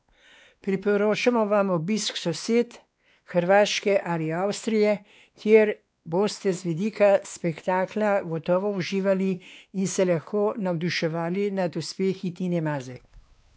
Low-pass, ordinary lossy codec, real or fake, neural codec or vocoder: none; none; fake; codec, 16 kHz, 2 kbps, X-Codec, WavLM features, trained on Multilingual LibriSpeech